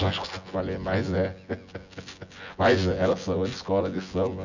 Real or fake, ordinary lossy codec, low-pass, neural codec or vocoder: fake; none; 7.2 kHz; vocoder, 24 kHz, 100 mel bands, Vocos